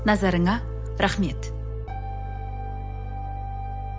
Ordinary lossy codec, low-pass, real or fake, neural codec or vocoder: none; none; real; none